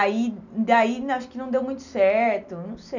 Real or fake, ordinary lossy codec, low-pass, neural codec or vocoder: real; none; 7.2 kHz; none